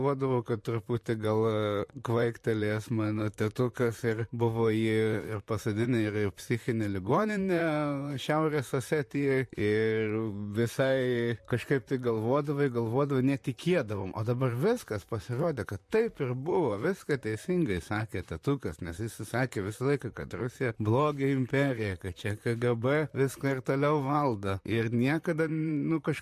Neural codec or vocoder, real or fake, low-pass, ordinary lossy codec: vocoder, 44.1 kHz, 128 mel bands, Pupu-Vocoder; fake; 14.4 kHz; MP3, 64 kbps